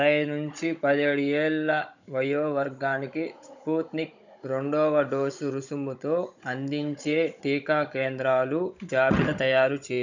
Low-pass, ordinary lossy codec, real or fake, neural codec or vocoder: 7.2 kHz; none; fake; codec, 16 kHz, 16 kbps, FunCodec, trained on Chinese and English, 50 frames a second